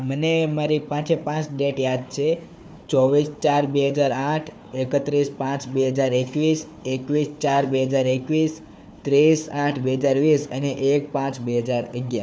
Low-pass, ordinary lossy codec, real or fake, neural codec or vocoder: none; none; fake; codec, 16 kHz, 4 kbps, FunCodec, trained on Chinese and English, 50 frames a second